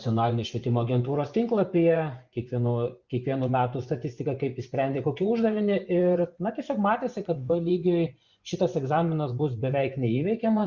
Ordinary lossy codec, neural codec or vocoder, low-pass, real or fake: Opus, 64 kbps; vocoder, 24 kHz, 100 mel bands, Vocos; 7.2 kHz; fake